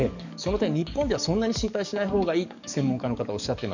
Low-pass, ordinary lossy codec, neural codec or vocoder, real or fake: 7.2 kHz; none; codec, 44.1 kHz, 7.8 kbps, DAC; fake